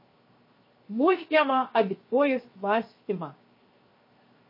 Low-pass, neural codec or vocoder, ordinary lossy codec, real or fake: 5.4 kHz; codec, 16 kHz, 0.7 kbps, FocalCodec; MP3, 24 kbps; fake